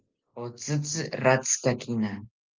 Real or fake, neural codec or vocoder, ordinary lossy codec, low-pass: real; none; Opus, 24 kbps; 7.2 kHz